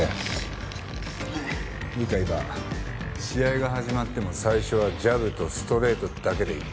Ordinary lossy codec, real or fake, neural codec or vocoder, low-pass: none; real; none; none